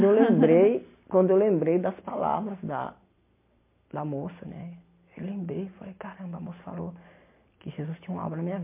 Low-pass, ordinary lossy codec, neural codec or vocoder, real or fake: 3.6 kHz; MP3, 24 kbps; none; real